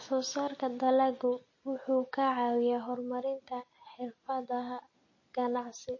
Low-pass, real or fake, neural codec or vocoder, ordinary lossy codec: 7.2 kHz; real; none; MP3, 32 kbps